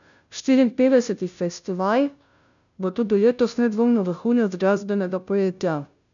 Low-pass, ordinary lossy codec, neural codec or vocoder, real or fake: 7.2 kHz; none; codec, 16 kHz, 0.5 kbps, FunCodec, trained on Chinese and English, 25 frames a second; fake